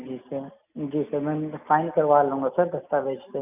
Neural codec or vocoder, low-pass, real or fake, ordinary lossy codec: none; 3.6 kHz; real; none